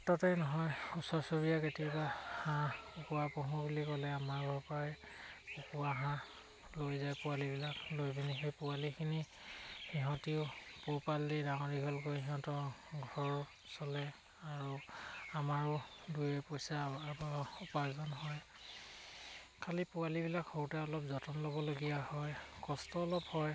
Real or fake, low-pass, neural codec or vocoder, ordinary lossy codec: real; none; none; none